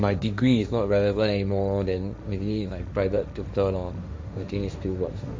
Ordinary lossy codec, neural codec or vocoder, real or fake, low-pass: none; codec, 16 kHz, 1.1 kbps, Voila-Tokenizer; fake; none